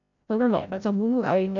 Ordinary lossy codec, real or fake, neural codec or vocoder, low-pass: none; fake; codec, 16 kHz, 0.5 kbps, FreqCodec, larger model; 7.2 kHz